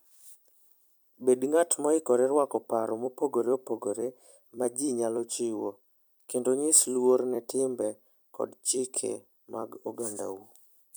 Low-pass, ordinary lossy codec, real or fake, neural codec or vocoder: none; none; fake; vocoder, 44.1 kHz, 128 mel bands every 256 samples, BigVGAN v2